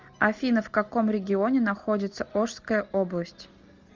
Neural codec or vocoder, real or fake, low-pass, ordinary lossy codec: none; real; 7.2 kHz; Opus, 32 kbps